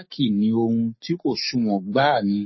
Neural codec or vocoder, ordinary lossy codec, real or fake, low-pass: codec, 44.1 kHz, 7.8 kbps, Pupu-Codec; MP3, 24 kbps; fake; 7.2 kHz